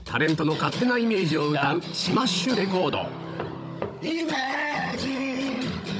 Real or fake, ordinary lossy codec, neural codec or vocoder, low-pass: fake; none; codec, 16 kHz, 16 kbps, FunCodec, trained on Chinese and English, 50 frames a second; none